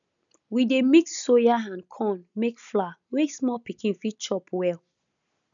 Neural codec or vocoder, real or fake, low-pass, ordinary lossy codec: none; real; 7.2 kHz; none